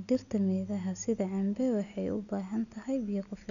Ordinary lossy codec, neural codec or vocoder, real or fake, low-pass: none; none; real; 7.2 kHz